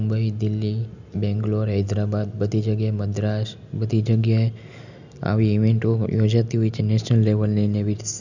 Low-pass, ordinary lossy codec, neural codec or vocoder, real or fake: 7.2 kHz; none; none; real